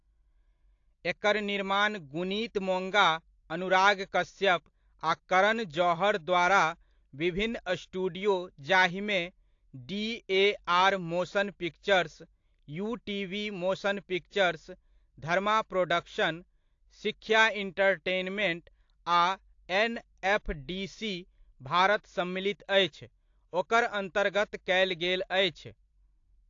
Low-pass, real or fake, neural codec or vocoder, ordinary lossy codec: 7.2 kHz; real; none; AAC, 48 kbps